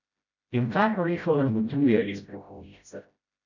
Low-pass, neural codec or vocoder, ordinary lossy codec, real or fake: 7.2 kHz; codec, 16 kHz, 0.5 kbps, FreqCodec, smaller model; none; fake